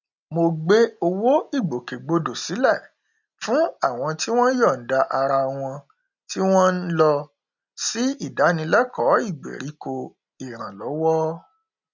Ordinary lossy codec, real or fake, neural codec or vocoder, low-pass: none; real; none; 7.2 kHz